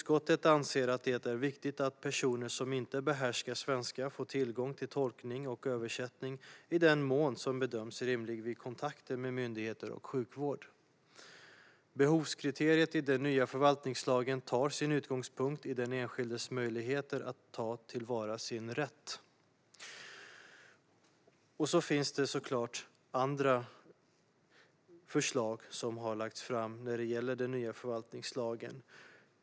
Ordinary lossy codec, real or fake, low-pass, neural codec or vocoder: none; real; none; none